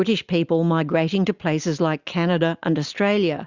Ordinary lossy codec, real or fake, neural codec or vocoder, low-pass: Opus, 64 kbps; real; none; 7.2 kHz